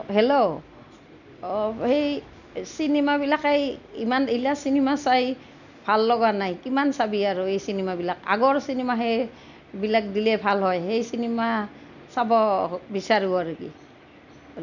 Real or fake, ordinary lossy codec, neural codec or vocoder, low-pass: real; none; none; 7.2 kHz